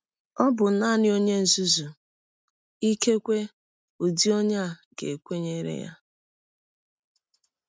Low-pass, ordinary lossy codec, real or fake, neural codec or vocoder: none; none; real; none